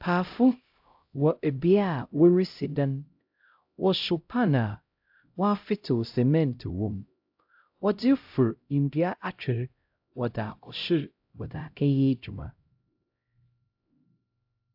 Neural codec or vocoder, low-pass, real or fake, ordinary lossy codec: codec, 16 kHz, 0.5 kbps, X-Codec, HuBERT features, trained on LibriSpeech; 5.4 kHz; fake; AAC, 48 kbps